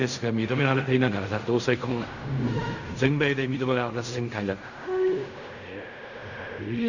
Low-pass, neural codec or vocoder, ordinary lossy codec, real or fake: 7.2 kHz; codec, 16 kHz in and 24 kHz out, 0.4 kbps, LongCat-Audio-Codec, fine tuned four codebook decoder; none; fake